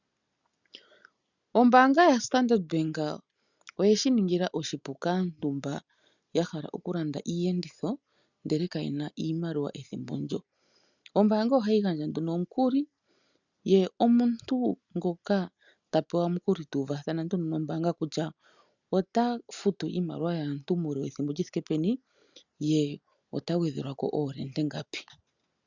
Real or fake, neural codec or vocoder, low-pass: real; none; 7.2 kHz